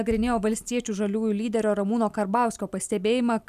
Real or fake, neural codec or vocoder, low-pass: real; none; 14.4 kHz